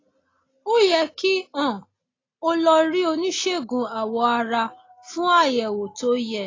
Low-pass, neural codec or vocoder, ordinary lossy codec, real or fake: 7.2 kHz; none; AAC, 32 kbps; real